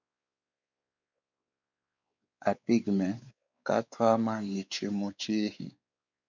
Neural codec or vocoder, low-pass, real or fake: codec, 16 kHz, 4 kbps, X-Codec, WavLM features, trained on Multilingual LibriSpeech; 7.2 kHz; fake